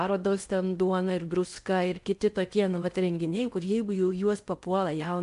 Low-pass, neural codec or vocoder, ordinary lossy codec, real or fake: 10.8 kHz; codec, 16 kHz in and 24 kHz out, 0.8 kbps, FocalCodec, streaming, 65536 codes; MP3, 64 kbps; fake